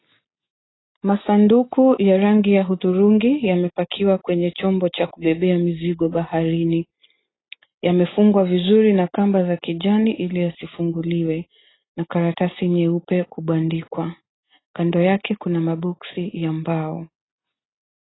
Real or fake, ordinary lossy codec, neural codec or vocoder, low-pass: real; AAC, 16 kbps; none; 7.2 kHz